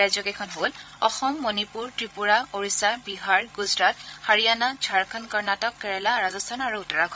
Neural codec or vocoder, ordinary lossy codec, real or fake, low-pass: codec, 16 kHz, 16 kbps, FreqCodec, larger model; none; fake; none